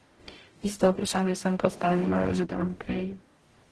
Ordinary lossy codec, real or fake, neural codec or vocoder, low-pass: Opus, 16 kbps; fake; codec, 44.1 kHz, 0.9 kbps, DAC; 10.8 kHz